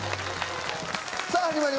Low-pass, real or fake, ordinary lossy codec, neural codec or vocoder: none; real; none; none